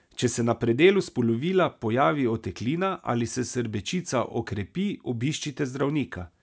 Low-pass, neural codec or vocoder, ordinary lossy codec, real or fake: none; none; none; real